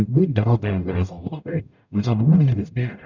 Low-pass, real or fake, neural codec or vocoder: 7.2 kHz; fake; codec, 44.1 kHz, 0.9 kbps, DAC